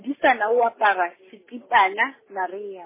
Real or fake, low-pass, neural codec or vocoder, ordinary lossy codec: real; 3.6 kHz; none; MP3, 16 kbps